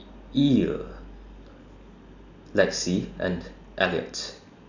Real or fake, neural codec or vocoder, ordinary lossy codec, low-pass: real; none; none; 7.2 kHz